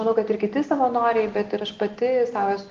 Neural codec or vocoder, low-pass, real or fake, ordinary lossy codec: none; 7.2 kHz; real; Opus, 16 kbps